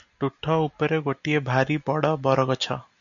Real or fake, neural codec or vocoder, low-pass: real; none; 7.2 kHz